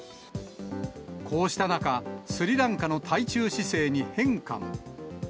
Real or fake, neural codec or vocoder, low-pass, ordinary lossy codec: real; none; none; none